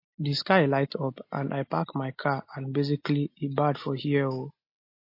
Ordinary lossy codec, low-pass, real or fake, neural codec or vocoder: MP3, 32 kbps; 5.4 kHz; real; none